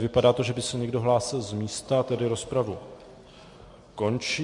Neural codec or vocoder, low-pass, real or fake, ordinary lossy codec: none; 10.8 kHz; real; MP3, 48 kbps